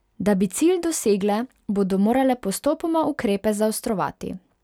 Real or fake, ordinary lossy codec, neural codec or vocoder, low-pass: real; none; none; 19.8 kHz